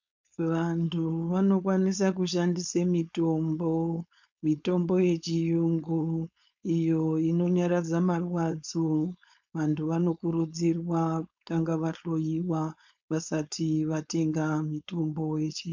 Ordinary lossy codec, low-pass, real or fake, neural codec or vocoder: MP3, 64 kbps; 7.2 kHz; fake; codec, 16 kHz, 4.8 kbps, FACodec